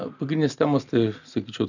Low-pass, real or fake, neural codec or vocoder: 7.2 kHz; real; none